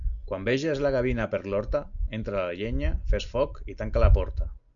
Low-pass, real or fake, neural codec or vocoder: 7.2 kHz; real; none